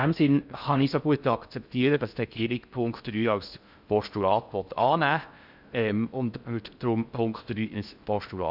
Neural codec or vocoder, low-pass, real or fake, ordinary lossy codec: codec, 16 kHz in and 24 kHz out, 0.6 kbps, FocalCodec, streaming, 4096 codes; 5.4 kHz; fake; none